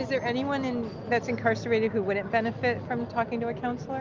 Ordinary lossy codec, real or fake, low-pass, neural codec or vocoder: Opus, 24 kbps; real; 7.2 kHz; none